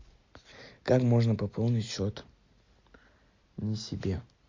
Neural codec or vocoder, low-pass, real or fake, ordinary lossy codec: none; 7.2 kHz; real; MP3, 48 kbps